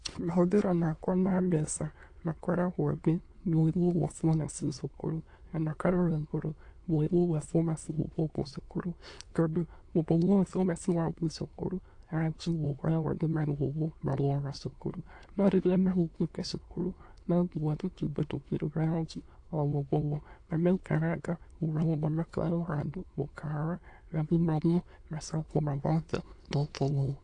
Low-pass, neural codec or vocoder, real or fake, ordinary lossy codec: 9.9 kHz; autoencoder, 22.05 kHz, a latent of 192 numbers a frame, VITS, trained on many speakers; fake; AAC, 48 kbps